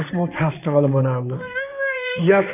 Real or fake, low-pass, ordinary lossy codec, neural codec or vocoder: fake; 3.6 kHz; none; codec, 16 kHz in and 24 kHz out, 2.2 kbps, FireRedTTS-2 codec